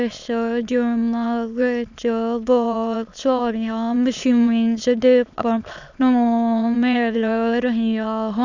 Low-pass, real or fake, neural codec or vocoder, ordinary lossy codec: 7.2 kHz; fake; autoencoder, 22.05 kHz, a latent of 192 numbers a frame, VITS, trained on many speakers; Opus, 64 kbps